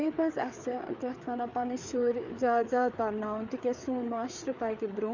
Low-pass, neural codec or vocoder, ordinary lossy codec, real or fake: 7.2 kHz; codec, 16 kHz, 4 kbps, FreqCodec, larger model; none; fake